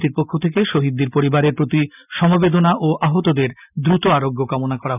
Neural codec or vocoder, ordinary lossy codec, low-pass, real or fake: none; none; 3.6 kHz; real